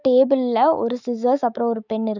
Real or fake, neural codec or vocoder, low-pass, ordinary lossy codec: fake; autoencoder, 48 kHz, 128 numbers a frame, DAC-VAE, trained on Japanese speech; 7.2 kHz; none